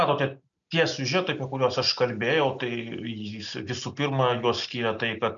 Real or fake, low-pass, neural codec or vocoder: real; 7.2 kHz; none